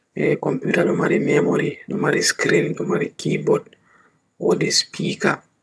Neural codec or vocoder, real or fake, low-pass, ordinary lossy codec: vocoder, 22.05 kHz, 80 mel bands, HiFi-GAN; fake; none; none